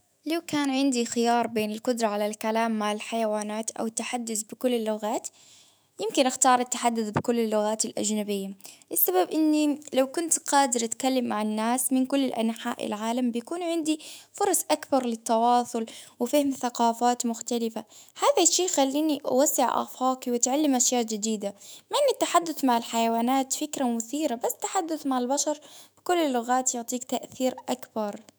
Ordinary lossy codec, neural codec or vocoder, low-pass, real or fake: none; autoencoder, 48 kHz, 128 numbers a frame, DAC-VAE, trained on Japanese speech; none; fake